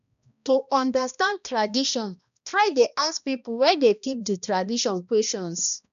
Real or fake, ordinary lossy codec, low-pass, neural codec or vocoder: fake; none; 7.2 kHz; codec, 16 kHz, 1 kbps, X-Codec, HuBERT features, trained on balanced general audio